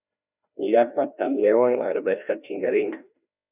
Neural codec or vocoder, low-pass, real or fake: codec, 16 kHz, 1 kbps, FreqCodec, larger model; 3.6 kHz; fake